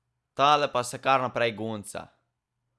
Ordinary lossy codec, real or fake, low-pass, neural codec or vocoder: none; real; none; none